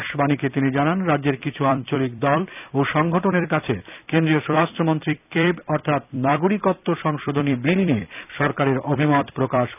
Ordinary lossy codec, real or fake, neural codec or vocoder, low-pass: none; fake; vocoder, 44.1 kHz, 128 mel bands every 256 samples, BigVGAN v2; 3.6 kHz